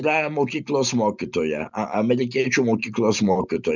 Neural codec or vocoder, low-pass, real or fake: none; 7.2 kHz; real